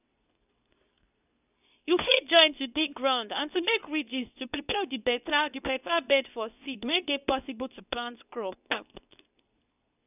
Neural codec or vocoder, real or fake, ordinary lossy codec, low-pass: codec, 24 kHz, 0.9 kbps, WavTokenizer, medium speech release version 2; fake; none; 3.6 kHz